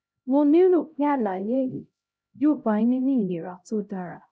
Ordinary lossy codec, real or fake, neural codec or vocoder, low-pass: none; fake; codec, 16 kHz, 0.5 kbps, X-Codec, HuBERT features, trained on LibriSpeech; none